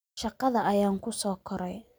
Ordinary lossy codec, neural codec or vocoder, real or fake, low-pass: none; none; real; none